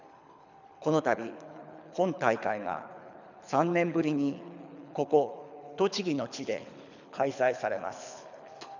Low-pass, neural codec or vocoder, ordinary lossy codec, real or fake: 7.2 kHz; codec, 24 kHz, 6 kbps, HILCodec; none; fake